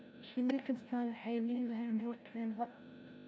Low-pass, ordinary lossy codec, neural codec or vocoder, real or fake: none; none; codec, 16 kHz, 0.5 kbps, FreqCodec, larger model; fake